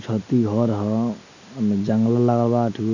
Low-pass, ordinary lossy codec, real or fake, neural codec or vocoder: 7.2 kHz; none; real; none